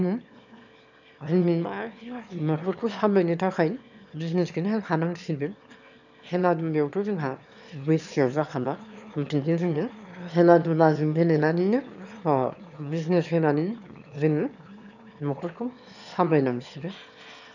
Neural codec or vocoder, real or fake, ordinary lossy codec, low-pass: autoencoder, 22.05 kHz, a latent of 192 numbers a frame, VITS, trained on one speaker; fake; none; 7.2 kHz